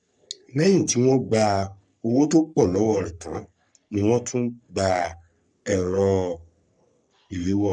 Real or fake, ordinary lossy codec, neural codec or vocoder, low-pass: fake; none; codec, 44.1 kHz, 3.4 kbps, Pupu-Codec; 9.9 kHz